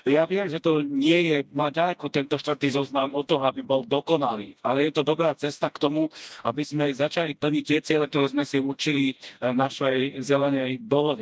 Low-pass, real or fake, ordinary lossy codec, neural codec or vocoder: none; fake; none; codec, 16 kHz, 1 kbps, FreqCodec, smaller model